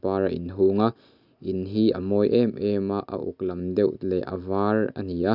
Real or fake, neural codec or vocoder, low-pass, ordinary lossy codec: real; none; 5.4 kHz; none